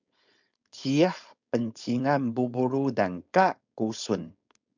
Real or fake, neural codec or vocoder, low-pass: fake; codec, 16 kHz, 4.8 kbps, FACodec; 7.2 kHz